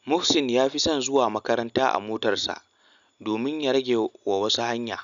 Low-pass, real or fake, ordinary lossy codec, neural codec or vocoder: 7.2 kHz; real; none; none